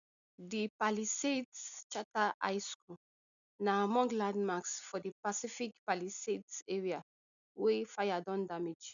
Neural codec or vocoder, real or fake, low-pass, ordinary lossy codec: none; real; 7.2 kHz; none